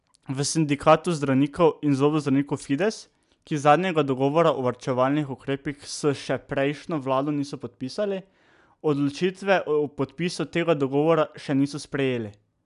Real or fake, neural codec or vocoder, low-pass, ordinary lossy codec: real; none; 10.8 kHz; none